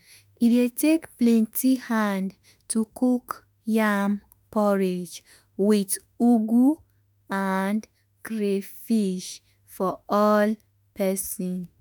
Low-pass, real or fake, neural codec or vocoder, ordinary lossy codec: none; fake; autoencoder, 48 kHz, 32 numbers a frame, DAC-VAE, trained on Japanese speech; none